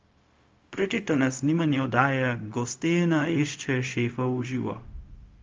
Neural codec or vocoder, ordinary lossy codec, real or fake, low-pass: codec, 16 kHz, 0.4 kbps, LongCat-Audio-Codec; Opus, 32 kbps; fake; 7.2 kHz